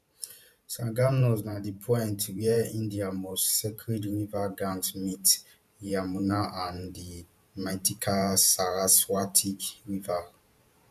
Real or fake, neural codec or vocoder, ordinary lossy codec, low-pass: fake; vocoder, 44.1 kHz, 128 mel bands every 512 samples, BigVGAN v2; none; 14.4 kHz